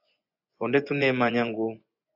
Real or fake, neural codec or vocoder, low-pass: fake; vocoder, 24 kHz, 100 mel bands, Vocos; 5.4 kHz